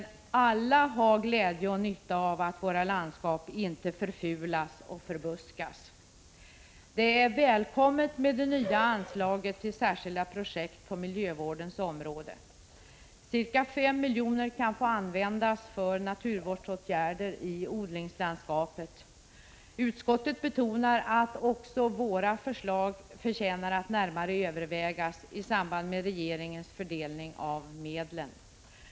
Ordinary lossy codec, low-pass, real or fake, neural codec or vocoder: none; none; real; none